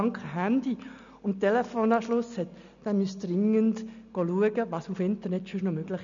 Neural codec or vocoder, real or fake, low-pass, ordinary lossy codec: none; real; 7.2 kHz; none